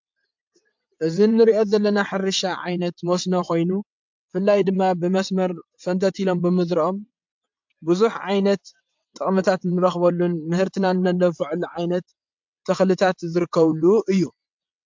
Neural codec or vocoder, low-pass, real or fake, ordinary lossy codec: vocoder, 22.05 kHz, 80 mel bands, WaveNeXt; 7.2 kHz; fake; MP3, 64 kbps